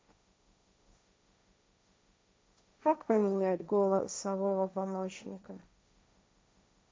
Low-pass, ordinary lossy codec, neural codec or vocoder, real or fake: none; none; codec, 16 kHz, 1.1 kbps, Voila-Tokenizer; fake